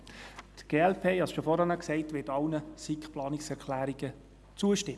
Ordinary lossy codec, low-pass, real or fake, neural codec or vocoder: none; none; real; none